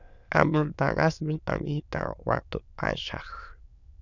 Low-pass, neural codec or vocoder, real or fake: 7.2 kHz; autoencoder, 22.05 kHz, a latent of 192 numbers a frame, VITS, trained on many speakers; fake